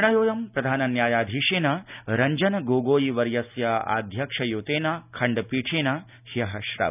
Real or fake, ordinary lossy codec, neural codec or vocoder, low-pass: real; none; none; 3.6 kHz